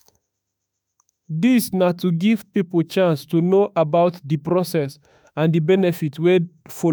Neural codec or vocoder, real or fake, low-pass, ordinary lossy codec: autoencoder, 48 kHz, 32 numbers a frame, DAC-VAE, trained on Japanese speech; fake; none; none